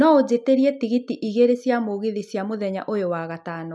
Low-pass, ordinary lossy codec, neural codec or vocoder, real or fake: none; none; none; real